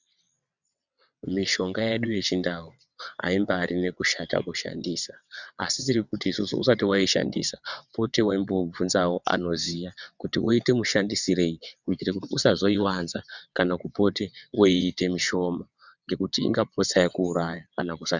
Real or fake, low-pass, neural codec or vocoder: fake; 7.2 kHz; vocoder, 22.05 kHz, 80 mel bands, WaveNeXt